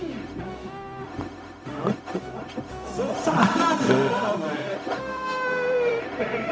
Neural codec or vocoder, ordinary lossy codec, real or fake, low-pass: codec, 16 kHz, 0.4 kbps, LongCat-Audio-Codec; none; fake; none